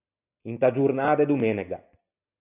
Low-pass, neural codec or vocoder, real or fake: 3.6 kHz; none; real